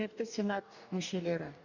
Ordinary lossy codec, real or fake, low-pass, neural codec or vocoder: none; fake; 7.2 kHz; codec, 44.1 kHz, 2.6 kbps, DAC